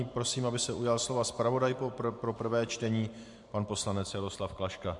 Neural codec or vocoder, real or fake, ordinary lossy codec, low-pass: vocoder, 44.1 kHz, 128 mel bands every 512 samples, BigVGAN v2; fake; MP3, 64 kbps; 10.8 kHz